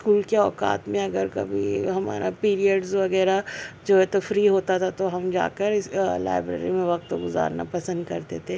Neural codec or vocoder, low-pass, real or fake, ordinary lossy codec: none; none; real; none